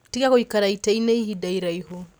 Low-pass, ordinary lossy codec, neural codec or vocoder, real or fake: none; none; none; real